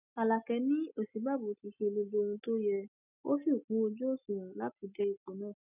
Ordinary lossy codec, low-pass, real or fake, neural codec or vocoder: none; 3.6 kHz; real; none